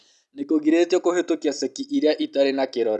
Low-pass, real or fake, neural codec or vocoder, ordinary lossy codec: none; real; none; none